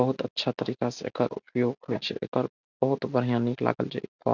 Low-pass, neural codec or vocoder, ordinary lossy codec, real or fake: 7.2 kHz; none; none; real